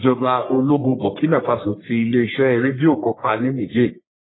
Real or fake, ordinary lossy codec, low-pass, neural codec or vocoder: fake; AAC, 16 kbps; 7.2 kHz; codec, 44.1 kHz, 1.7 kbps, Pupu-Codec